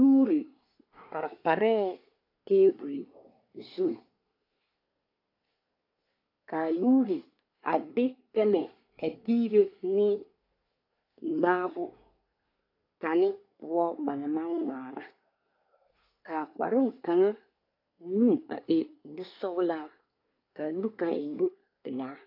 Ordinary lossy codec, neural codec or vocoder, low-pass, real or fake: AAC, 32 kbps; codec, 24 kHz, 1 kbps, SNAC; 5.4 kHz; fake